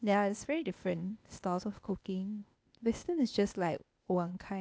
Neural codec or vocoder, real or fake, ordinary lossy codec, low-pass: codec, 16 kHz, 0.8 kbps, ZipCodec; fake; none; none